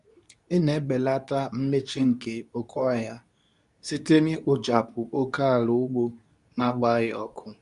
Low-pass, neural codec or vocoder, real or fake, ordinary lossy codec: 10.8 kHz; codec, 24 kHz, 0.9 kbps, WavTokenizer, medium speech release version 2; fake; none